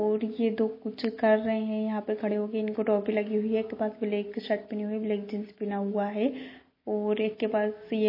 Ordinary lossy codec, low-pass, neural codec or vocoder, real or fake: MP3, 24 kbps; 5.4 kHz; none; real